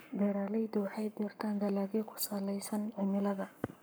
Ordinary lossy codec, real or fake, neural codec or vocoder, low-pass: none; fake; codec, 44.1 kHz, 7.8 kbps, Pupu-Codec; none